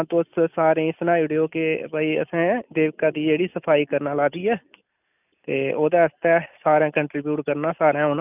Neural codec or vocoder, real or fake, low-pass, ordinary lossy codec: none; real; 3.6 kHz; none